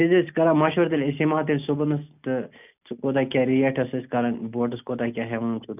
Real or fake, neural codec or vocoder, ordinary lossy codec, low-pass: real; none; none; 3.6 kHz